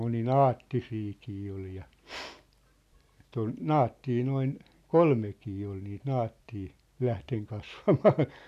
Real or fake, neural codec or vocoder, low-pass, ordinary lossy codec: real; none; 14.4 kHz; none